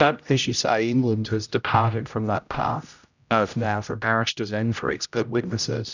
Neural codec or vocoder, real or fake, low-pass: codec, 16 kHz, 0.5 kbps, X-Codec, HuBERT features, trained on general audio; fake; 7.2 kHz